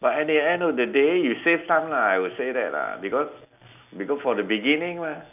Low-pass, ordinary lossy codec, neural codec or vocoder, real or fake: 3.6 kHz; none; none; real